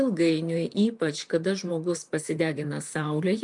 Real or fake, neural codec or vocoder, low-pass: fake; vocoder, 44.1 kHz, 128 mel bands, Pupu-Vocoder; 10.8 kHz